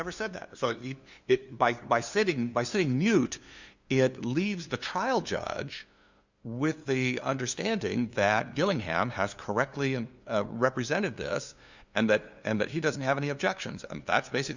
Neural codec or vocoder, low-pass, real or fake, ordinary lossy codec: codec, 16 kHz, 2 kbps, FunCodec, trained on LibriTTS, 25 frames a second; 7.2 kHz; fake; Opus, 64 kbps